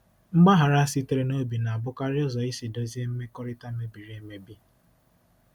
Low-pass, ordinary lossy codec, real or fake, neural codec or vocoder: 19.8 kHz; none; real; none